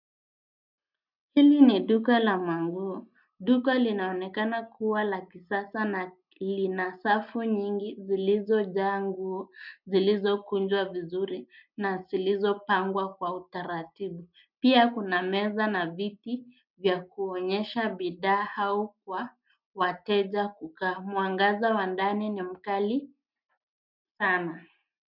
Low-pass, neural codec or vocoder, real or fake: 5.4 kHz; none; real